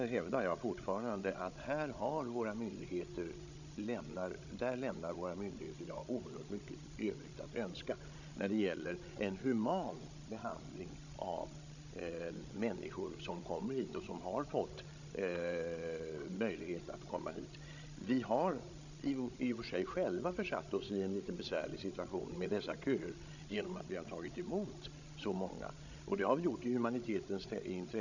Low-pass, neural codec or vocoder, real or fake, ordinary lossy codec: 7.2 kHz; codec, 16 kHz, 16 kbps, FreqCodec, larger model; fake; none